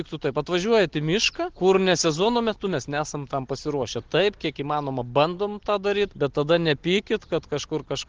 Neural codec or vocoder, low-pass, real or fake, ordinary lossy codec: none; 7.2 kHz; real; Opus, 16 kbps